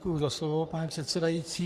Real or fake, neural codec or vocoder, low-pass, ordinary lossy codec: fake; codec, 44.1 kHz, 3.4 kbps, Pupu-Codec; 14.4 kHz; Opus, 64 kbps